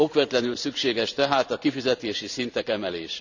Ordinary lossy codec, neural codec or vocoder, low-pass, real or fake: none; vocoder, 44.1 kHz, 128 mel bands every 512 samples, BigVGAN v2; 7.2 kHz; fake